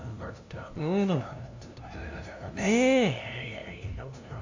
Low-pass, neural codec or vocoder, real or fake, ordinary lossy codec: 7.2 kHz; codec, 16 kHz, 0.5 kbps, FunCodec, trained on LibriTTS, 25 frames a second; fake; AAC, 48 kbps